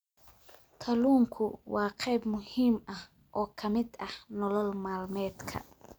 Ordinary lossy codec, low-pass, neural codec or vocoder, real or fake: none; none; none; real